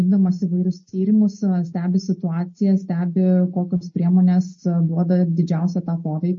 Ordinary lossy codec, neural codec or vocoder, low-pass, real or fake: MP3, 32 kbps; none; 7.2 kHz; real